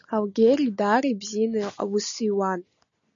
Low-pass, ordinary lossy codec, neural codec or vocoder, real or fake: 7.2 kHz; MP3, 64 kbps; none; real